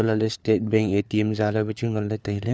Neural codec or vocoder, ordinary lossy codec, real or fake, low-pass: codec, 16 kHz, 4 kbps, FunCodec, trained on LibriTTS, 50 frames a second; none; fake; none